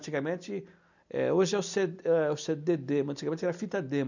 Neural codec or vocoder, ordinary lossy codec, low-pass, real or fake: none; none; 7.2 kHz; real